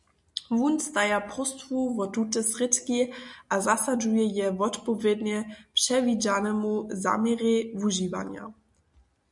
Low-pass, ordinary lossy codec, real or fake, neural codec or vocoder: 10.8 kHz; MP3, 96 kbps; real; none